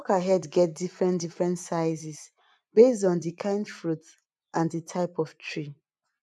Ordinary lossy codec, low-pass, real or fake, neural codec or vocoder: none; none; fake; vocoder, 24 kHz, 100 mel bands, Vocos